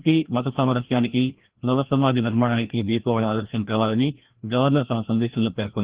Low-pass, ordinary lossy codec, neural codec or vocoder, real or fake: 3.6 kHz; Opus, 16 kbps; codec, 16 kHz, 1 kbps, FreqCodec, larger model; fake